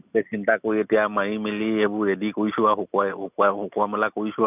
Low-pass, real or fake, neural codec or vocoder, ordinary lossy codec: 3.6 kHz; real; none; none